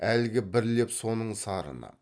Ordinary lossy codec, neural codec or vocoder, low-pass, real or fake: none; none; none; real